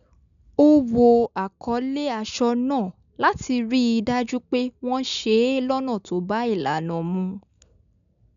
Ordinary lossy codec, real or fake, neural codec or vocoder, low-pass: none; real; none; 7.2 kHz